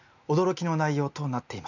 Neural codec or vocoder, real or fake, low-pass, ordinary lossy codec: none; real; 7.2 kHz; none